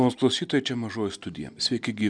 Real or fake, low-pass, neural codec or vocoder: real; 9.9 kHz; none